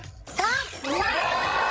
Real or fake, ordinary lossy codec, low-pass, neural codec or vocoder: fake; none; none; codec, 16 kHz, 16 kbps, FreqCodec, larger model